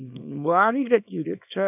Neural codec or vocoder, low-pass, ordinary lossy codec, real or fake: codec, 24 kHz, 0.9 kbps, WavTokenizer, small release; 3.6 kHz; none; fake